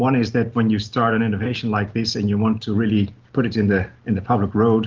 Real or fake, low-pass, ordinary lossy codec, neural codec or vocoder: real; 7.2 kHz; Opus, 24 kbps; none